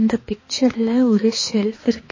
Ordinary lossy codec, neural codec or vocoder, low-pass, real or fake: MP3, 32 kbps; codec, 16 kHz, 2 kbps, X-Codec, HuBERT features, trained on balanced general audio; 7.2 kHz; fake